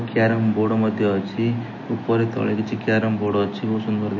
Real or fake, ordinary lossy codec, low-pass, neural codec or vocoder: real; MP3, 32 kbps; 7.2 kHz; none